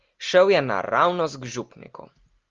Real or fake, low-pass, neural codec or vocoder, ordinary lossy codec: real; 7.2 kHz; none; Opus, 32 kbps